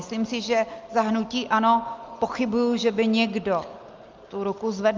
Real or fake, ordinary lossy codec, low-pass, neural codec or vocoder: real; Opus, 24 kbps; 7.2 kHz; none